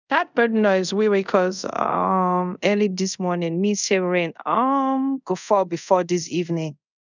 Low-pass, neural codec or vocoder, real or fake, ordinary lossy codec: 7.2 kHz; codec, 24 kHz, 0.5 kbps, DualCodec; fake; none